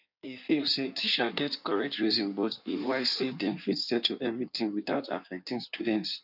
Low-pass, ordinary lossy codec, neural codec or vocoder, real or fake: 5.4 kHz; none; codec, 16 kHz in and 24 kHz out, 1.1 kbps, FireRedTTS-2 codec; fake